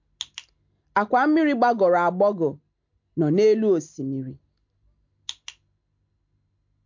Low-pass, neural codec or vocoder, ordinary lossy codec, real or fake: 7.2 kHz; none; MP3, 48 kbps; real